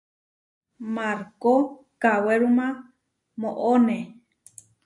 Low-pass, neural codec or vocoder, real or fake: 10.8 kHz; none; real